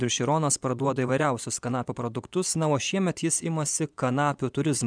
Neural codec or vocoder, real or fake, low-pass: vocoder, 24 kHz, 100 mel bands, Vocos; fake; 9.9 kHz